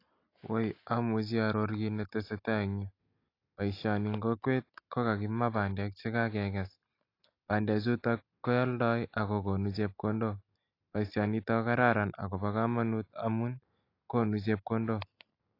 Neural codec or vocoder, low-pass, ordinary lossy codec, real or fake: none; 5.4 kHz; AAC, 32 kbps; real